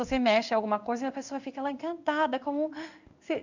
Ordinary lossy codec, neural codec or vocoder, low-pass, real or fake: none; codec, 16 kHz in and 24 kHz out, 1 kbps, XY-Tokenizer; 7.2 kHz; fake